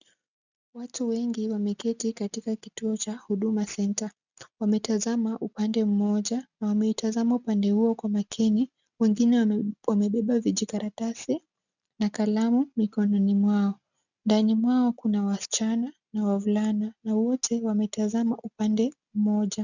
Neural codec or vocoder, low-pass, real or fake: none; 7.2 kHz; real